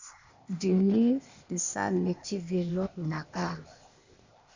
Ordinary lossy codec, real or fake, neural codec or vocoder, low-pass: Opus, 64 kbps; fake; codec, 16 kHz, 0.8 kbps, ZipCodec; 7.2 kHz